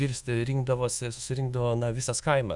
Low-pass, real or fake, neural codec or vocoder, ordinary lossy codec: 10.8 kHz; fake; codec, 24 kHz, 1.2 kbps, DualCodec; Opus, 64 kbps